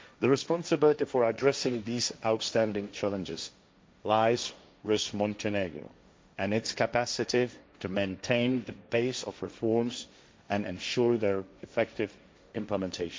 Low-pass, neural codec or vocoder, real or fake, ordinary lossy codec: none; codec, 16 kHz, 1.1 kbps, Voila-Tokenizer; fake; none